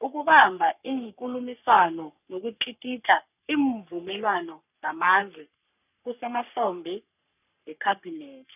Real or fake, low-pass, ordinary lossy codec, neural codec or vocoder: fake; 3.6 kHz; none; codec, 44.1 kHz, 3.4 kbps, Pupu-Codec